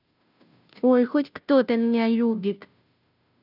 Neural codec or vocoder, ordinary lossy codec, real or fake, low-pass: codec, 16 kHz, 0.5 kbps, FunCodec, trained on Chinese and English, 25 frames a second; none; fake; 5.4 kHz